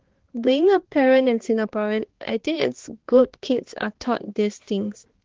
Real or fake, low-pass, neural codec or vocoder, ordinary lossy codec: fake; 7.2 kHz; codec, 16 kHz, 2 kbps, X-Codec, HuBERT features, trained on balanced general audio; Opus, 16 kbps